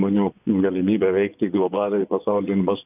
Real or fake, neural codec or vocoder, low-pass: fake; vocoder, 24 kHz, 100 mel bands, Vocos; 3.6 kHz